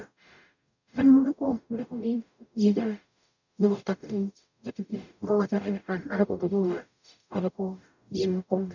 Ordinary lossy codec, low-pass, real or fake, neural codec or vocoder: none; 7.2 kHz; fake; codec, 44.1 kHz, 0.9 kbps, DAC